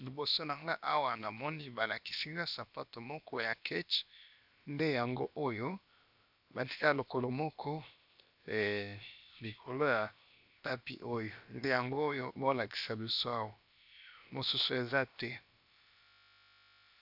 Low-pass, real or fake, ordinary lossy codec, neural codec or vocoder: 5.4 kHz; fake; AAC, 48 kbps; codec, 16 kHz, about 1 kbps, DyCAST, with the encoder's durations